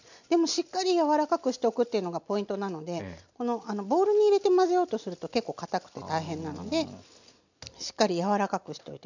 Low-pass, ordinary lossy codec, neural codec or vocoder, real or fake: 7.2 kHz; none; none; real